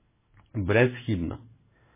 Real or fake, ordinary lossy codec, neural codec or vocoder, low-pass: fake; MP3, 16 kbps; codec, 16 kHz in and 24 kHz out, 1 kbps, XY-Tokenizer; 3.6 kHz